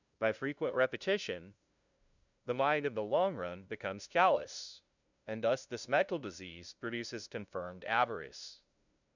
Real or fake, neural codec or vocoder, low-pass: fake; codec, 16 kHz, 0.5 kbps, FunCodec, trained on LibriTTS, 25 frames a second; 7.2 kHz